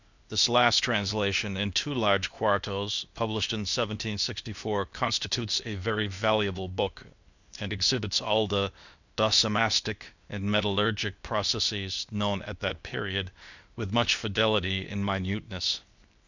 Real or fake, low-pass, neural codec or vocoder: fake; 7.2 kHz; codec, 16 kHz, 0.8 kbps, ZipCodec